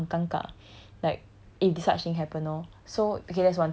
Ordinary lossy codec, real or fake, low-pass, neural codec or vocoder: none; real; none; none